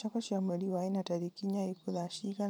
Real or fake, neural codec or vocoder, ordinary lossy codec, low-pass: fake; vocoder, 44.1 kHz, 128 mel bands every 512 samples, BigVGAN v2; none; none